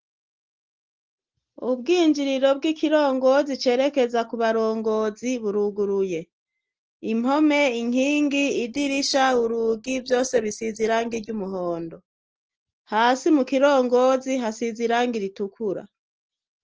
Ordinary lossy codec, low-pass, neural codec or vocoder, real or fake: Opus, 16 kbps; 7.2 kHz; none; real